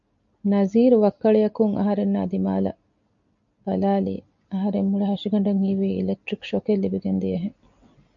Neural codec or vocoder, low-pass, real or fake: none; 7.2 kHz; real